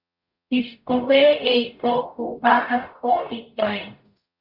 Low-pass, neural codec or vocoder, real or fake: 5.4 kHz; codec, 44.1 kHz, 0.9 kbps, DAC; fake